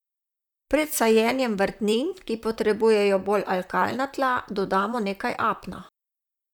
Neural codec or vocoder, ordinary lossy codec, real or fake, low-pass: vocoder, 44.1 kHz, 128 mel bands, Pupu-Vocoder; none; fake; 19.8 kHz